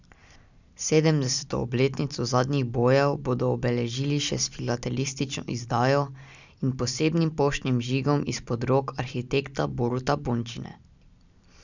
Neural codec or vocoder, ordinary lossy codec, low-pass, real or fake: none; none; 7.2 kHz; real